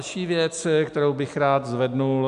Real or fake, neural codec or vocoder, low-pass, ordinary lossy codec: real; none; 10.8 kHz; MP3, 96 kbps